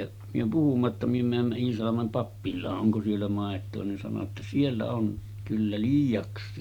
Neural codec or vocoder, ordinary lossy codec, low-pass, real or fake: none; Opus, 64 kbps; 19.8 kHz; real